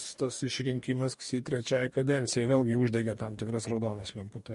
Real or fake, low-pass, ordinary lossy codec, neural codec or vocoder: fake; 14.4 kHz; MP3, 48 kbps; codec, 44.1 kHz, 2.6 kbps, DAC